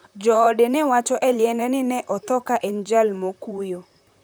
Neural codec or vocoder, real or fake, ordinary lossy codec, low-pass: vocoder, 44.1 kHz, 128 mel bands, Pupu-Vocoder; fake; none; none